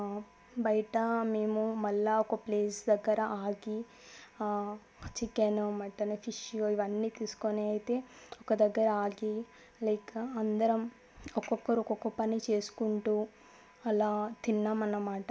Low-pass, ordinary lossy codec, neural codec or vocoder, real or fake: none; none; none; real